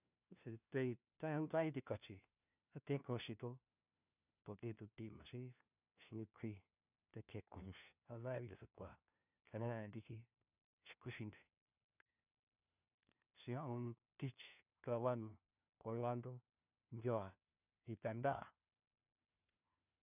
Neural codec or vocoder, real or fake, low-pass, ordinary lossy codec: codec, 16 kHz, 1 kbps, FunCodec, trained on LibriTTS, 50 frames a second; fake; 3.6 kHz; none